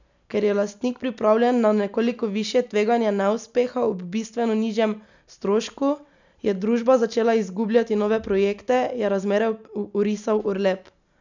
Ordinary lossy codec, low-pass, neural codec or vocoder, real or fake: none; 7.2 kHz; none; real